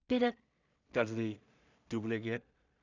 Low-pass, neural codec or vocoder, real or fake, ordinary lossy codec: 7.2 kHz; codec, 16 kHz in and 24 kHz out, 0.4 kbps, LongCat-Audio-Codec, two codebook decoder; fake; none